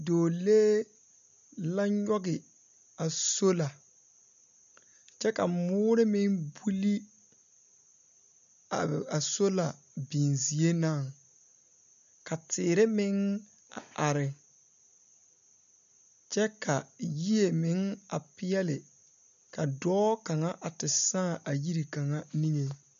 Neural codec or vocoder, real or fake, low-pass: none; real; 7.2 kHz